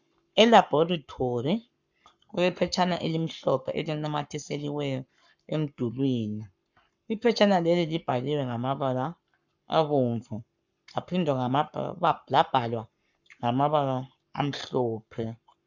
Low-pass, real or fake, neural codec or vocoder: 7.2 kHz; fake; codec, 44.1 kHz, 7.8 kbps, Pupu-Codec